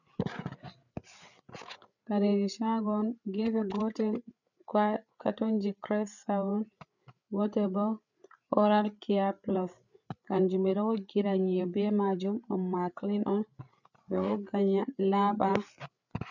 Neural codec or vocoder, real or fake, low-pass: codec, 16 kHz, 8 kbps, FreqCodec, larger model; fake; 7.2 kHz